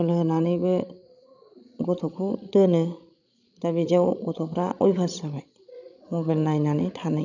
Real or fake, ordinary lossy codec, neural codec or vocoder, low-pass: fake; none; codec, 16 kHz, 16 kbps, FreqCodec, larger model; 7.2 kHz